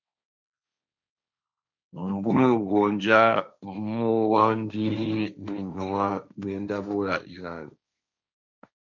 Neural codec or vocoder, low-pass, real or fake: codec, 16 kHz, 1.1 kbps, Voila-Tokenizer; 7.2 kHz; fake